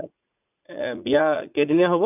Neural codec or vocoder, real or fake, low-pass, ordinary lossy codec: vocoder, 44.1 kHz, 80 mel bands, Vocos; fake; 3.6 kHz; none